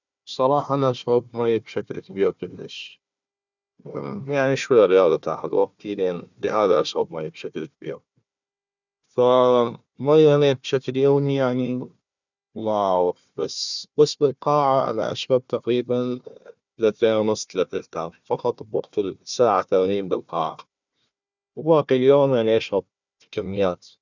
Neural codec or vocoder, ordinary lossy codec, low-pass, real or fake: codec, 16 kHz, 1 kbps, FunCodec, trained on Chinese and English, 50 frames a second; none; 7.2 kHz; fake